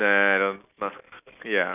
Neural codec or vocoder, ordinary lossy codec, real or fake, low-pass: none; none; real; 3.6 kHz